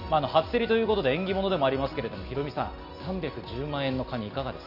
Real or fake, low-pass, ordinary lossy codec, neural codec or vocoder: real; 5.4 kHz; none; none